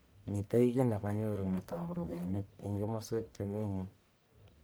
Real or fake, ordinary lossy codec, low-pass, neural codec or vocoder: fake; none; none; codec, 44.1 kHz, 1.7 kbps, Pupu-Codec